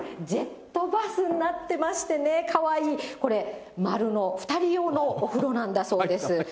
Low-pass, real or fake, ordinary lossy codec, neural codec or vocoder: none; real; none; none